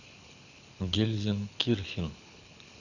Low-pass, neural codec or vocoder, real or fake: 7.2 kHz; codec, 24 kHz, 6 kbps, HILCodec; fake